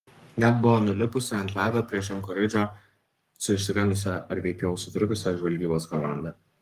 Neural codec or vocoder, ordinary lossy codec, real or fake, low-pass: codec, 44.1 kHz, 3.4 kbps, Pupu-Codec; Opus, 32 kbps; fake; 14.4 kHz